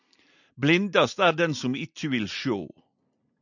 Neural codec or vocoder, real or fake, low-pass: none; real; 7.2 kHz